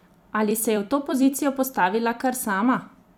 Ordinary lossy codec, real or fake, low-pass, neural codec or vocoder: none; fake; none; vocoder, 44.1 kHz, 128 mel bands every 256 samples, BigVGAN v2